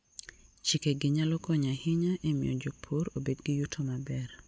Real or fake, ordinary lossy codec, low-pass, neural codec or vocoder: real; none; none; none